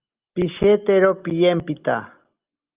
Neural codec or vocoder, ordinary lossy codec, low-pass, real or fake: none; Opus, 24 kbps; 3.6 kHz; real